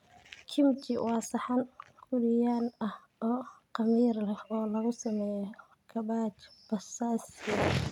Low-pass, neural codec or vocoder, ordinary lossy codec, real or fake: 19.8 kHz; none; none; real